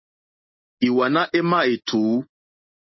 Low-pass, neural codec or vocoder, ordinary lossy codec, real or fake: 7.2 kHz; none; MP3, 24 kbps; real